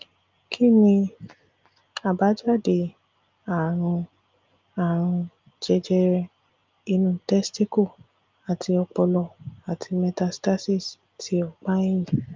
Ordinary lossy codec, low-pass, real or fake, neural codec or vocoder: Opus, 24 kbps; 7.2 kHz; real; none